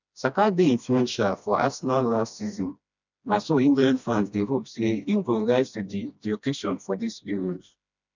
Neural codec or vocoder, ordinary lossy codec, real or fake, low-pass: codec, 16 kHz, 1 kbps, FreqCodec, smaller model; none; fake; 7.2 kHz